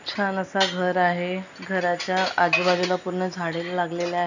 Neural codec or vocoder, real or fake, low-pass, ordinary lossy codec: none; real; 7.2 kHz; none